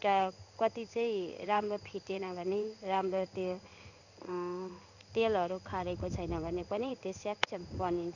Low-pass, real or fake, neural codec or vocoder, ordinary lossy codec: 7.2 kHz; fake; codec, 16 kHz in and 24 kHz out, 1 kbps, XY-Tokenizer; none